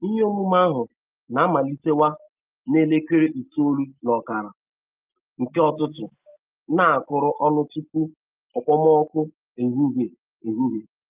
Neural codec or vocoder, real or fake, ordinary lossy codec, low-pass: none; real; Opus, 32 kbps; 3.6 kHz